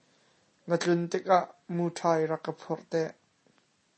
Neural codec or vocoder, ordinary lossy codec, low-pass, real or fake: none; MP3, 32 kbps; 10.8 kHz; real